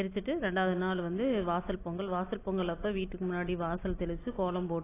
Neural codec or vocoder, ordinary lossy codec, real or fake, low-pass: none; AAC, 16 kbps; real; 3.6 kHz